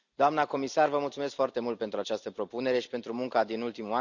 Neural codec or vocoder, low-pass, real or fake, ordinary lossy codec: none; 7.2 kHz; real; none